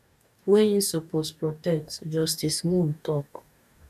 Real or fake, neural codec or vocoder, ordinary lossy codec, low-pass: fake; codec, 44.1 kHz, 2.6 kbps, DAC; none; 14.4 kHz